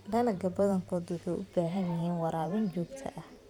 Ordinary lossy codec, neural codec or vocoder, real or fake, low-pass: none; vocoder, 44.1 kHz, 128 mel bands every 512 samples, BigVGAN v2; fake; 19.8 kHz